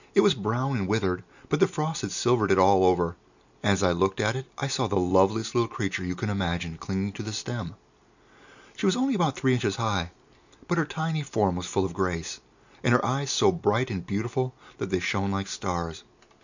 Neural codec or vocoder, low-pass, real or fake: none; 7.2 kHz; real